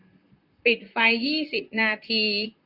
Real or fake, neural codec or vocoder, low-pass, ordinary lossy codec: fake; vocoder, 44.1 kHz, 128 mel bands, Pupu-Vocoder; 5.4 kHz; none